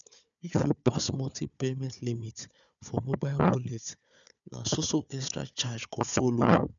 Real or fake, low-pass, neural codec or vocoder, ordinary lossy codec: fake; 7.2 kHz; codec, 16 kHz, 4 kbps, FunCodec, trained on Chinese and English, 50 frames a second; none